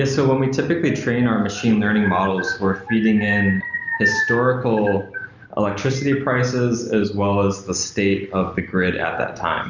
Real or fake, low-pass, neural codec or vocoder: real; 7.2 kHz; none